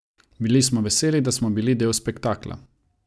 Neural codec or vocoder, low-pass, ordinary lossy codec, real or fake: none; none; none; real